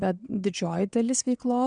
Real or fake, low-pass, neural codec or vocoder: fake; 9.9 kHz; vocoder, 22.05 kHz, 80 mel bands, WaveNeXt